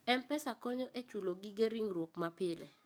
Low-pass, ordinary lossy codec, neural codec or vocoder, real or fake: none; none; codec, 44.1 kHz, 7.8 kbps, DAC; fake